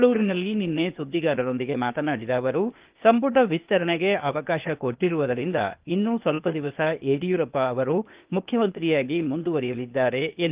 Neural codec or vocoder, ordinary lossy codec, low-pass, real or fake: codec, 16 kHz, 0.8 kbps, ZipCodec; Opus, 24 kbps; 3.6 kHz; fake